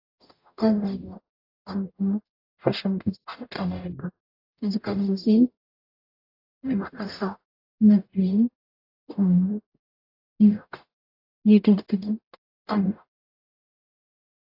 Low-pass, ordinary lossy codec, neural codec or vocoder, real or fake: 5.4 kHz; AAC, 32 kbps; codec, 44.1 kHz, 0.9 kbps, DAC; fake